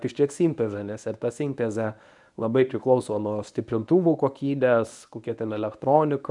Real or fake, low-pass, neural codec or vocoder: fake; 10.8 kHz; codec, 24 kHz, 0.9 kbps, WavTokenizer, medium speech release version 1